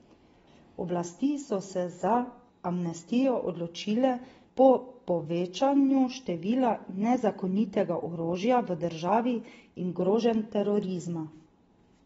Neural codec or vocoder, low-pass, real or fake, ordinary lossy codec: none; 10.8 kHz; real; AAC, 24 kbps